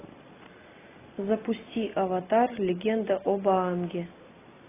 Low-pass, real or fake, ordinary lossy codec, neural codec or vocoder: 3.6 kHz; real; AAC, 16 kbps; none